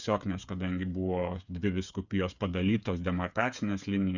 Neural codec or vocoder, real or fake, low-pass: codec, 16 kHz, 8 kbps, FreqCodec, smaller model; fake; 7.2 kHz